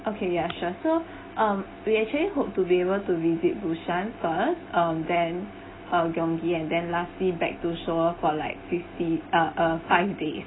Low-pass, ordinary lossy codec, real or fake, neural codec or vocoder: 7.2 kHz; AAC, 16 kbps; real; none